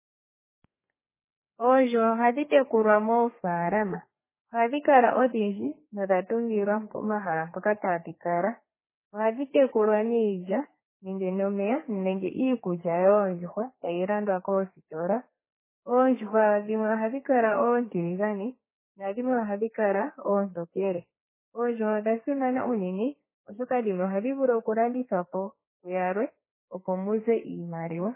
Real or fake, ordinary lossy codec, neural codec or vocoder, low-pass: fake; MP3, 16 kbps; codec, 32 kHz, 1.9 kbps, SNAC; 3.6 kHz